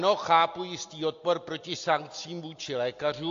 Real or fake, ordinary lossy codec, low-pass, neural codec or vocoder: real; AAC, 48 kbps; 7.2 kHz; none